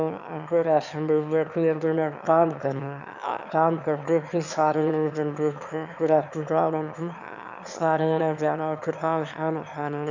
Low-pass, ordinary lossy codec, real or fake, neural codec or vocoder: 7.2 kHz; none; fake; autoencoder, 22.05 kHz, a latent of 192 numbers a frame, VITS, trained on one speaker